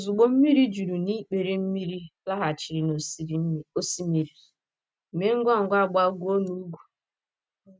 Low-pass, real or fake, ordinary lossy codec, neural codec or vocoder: none; real; none; none